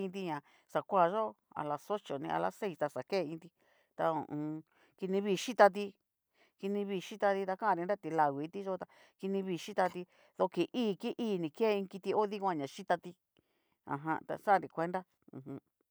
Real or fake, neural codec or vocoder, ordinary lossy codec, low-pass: real; none; none; none